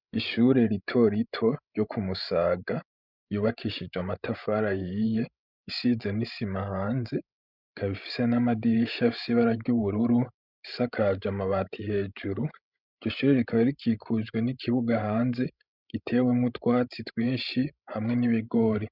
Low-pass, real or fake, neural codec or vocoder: 5.4 kHz; fake; codec, 16 kHz, 16 kbps, FreqCodec, larger model